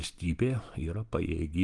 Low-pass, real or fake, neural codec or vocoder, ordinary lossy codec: 10.8 kHz; real; none; Opus, 24 kbps